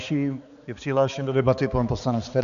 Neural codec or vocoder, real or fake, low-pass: codec, 16 kHz, 4 kbps, X-Codec, HuBERT features, trained on balanced general audio; fake; 7.2 kHz